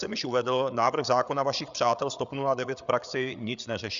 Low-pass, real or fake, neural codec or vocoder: 7.2 kHz; fake; codec, 16 kHz, 8 kbps, FreqCodec, larger model